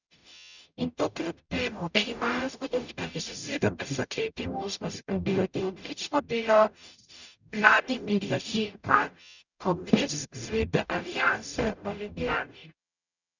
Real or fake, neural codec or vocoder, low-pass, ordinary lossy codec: fake; codec, 44.1 kHz, 0.9 kbps, DAC; 7.2 kHz; none